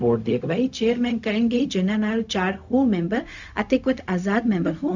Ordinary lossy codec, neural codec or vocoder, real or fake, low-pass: none; codec, 16 kHz, 0.4 kbps, LongCat-Audio-Codec; fake; 7.2 kHz